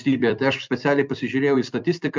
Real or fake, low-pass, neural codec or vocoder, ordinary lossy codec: fake; 7.2 kHz; vocoder, 44.1 kHz, 128 mel bands every 256 samples, BigVGAN v2; MP3, 64 kbps